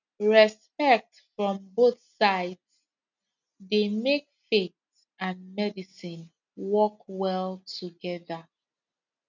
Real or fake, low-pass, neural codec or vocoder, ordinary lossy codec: real; 7.2 kHz; none; none